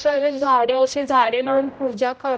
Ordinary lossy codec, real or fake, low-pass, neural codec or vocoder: none; fake; none; codec, 16 kHz, 0.5 kbps, X-Codec, HuBERT features, trained on general audio